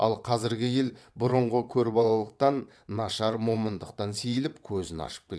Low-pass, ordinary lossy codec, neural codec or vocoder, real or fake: none; none; vocoder, 22.05 kHz, 80 mel bands, WaveNeXt; fake